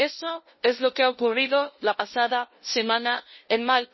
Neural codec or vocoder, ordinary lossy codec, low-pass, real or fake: codec, 16 kHz, 0.5 kbps, FunCodec, trained on LibriTTS, 25 frames a second; MP3, 24 kbps; 7.2 kHz; fake